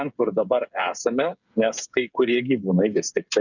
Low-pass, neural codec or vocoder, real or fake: 7.2 kHz; codec, 16 kHz, 8 kbps, FreqCodec, smaller model; fake